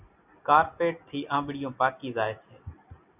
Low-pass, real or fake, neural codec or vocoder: 3.6 kHz; real; none